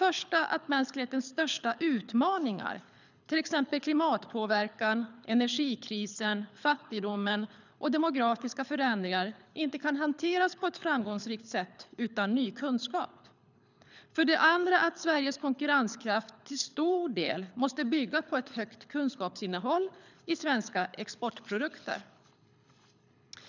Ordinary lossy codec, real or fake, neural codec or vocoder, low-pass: none; fake; codec, 24 kHz, 6 kbps, HILCodec; 7.2 kHz